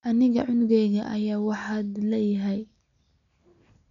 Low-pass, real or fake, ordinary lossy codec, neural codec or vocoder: 7.2 kHz; real; none; none